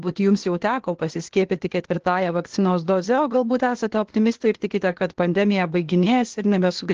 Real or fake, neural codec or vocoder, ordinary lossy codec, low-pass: fake; codec, 16 kHz, 0.8 kbps, ZipCodec; Opus, 16 kbps; 7.2 kHz